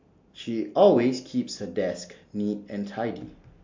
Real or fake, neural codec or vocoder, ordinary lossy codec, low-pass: real; none; MP3, 48 kbps; 7.2 kHz